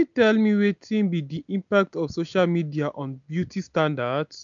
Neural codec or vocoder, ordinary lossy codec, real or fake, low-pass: none; none; real; 7.2 kHz